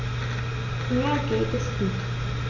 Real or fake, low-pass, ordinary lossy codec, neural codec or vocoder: real; 7.2 kHz; none; none